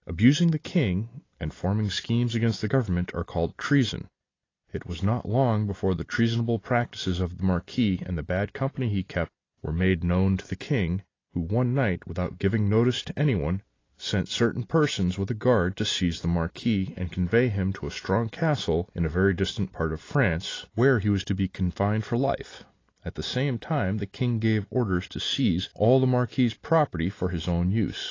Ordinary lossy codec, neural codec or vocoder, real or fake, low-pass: AAC, 32 kbps; none; real; 7.2 kHz